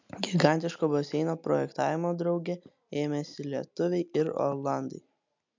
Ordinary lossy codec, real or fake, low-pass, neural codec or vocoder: MP3, 64 kbps; real; 7.2 kHz; none